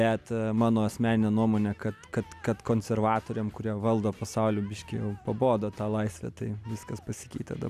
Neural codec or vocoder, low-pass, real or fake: none; 14.4 kHz; real